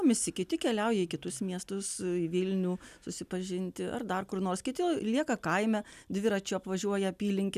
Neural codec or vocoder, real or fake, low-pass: none; real; 14.4 kHz